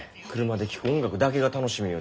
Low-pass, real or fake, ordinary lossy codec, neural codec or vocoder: none; real; none; none